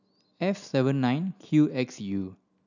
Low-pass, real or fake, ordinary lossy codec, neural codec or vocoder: 7.2 kHz; real; none; none